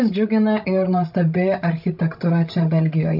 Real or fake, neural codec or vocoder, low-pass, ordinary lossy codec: fake; codec, 16 kHz, 16 kbps, FreqCodec, larger model; 5.4 kHz; AAC, 48 kbps